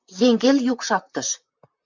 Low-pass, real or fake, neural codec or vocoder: 7.2 kHz; fake; vocoder, 44.1 kHz, 128 mel bands, Pupu-Vocoder